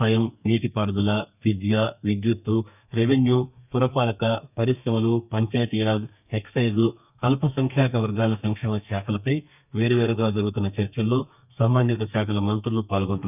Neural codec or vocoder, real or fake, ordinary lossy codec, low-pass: codec, 32 kHz, 1.9 kbps, SNAC; fake; none; 3.6 kHz